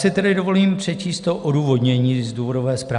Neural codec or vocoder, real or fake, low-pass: none; real; 10.8 kHz